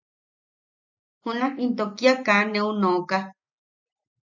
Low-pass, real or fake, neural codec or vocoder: 7.2 kHz; real; none